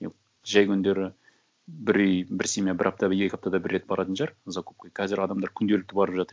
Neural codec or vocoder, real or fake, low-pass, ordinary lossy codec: none; real; none; none